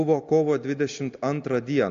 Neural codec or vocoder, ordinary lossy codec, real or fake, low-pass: none; AAC, 48 kbps; real; 7.2 kHz